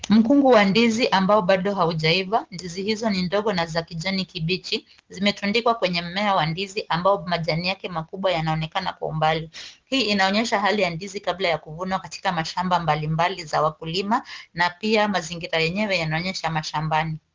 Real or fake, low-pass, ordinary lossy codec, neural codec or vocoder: real; 7.2 kHz; Opus, 16 kbps; none